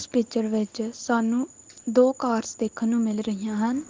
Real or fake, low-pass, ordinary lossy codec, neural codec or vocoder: real; 7.2 kHz; Opus, 16 kbps; none